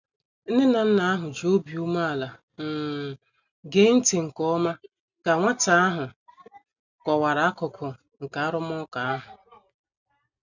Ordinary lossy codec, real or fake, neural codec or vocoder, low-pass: none; real; none; 7.2 kHz